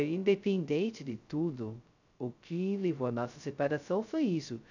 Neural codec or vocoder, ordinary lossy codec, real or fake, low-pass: codec, 16 kHz, 0.2 kbps, FocalCodec; none; fake; 7.2 kHz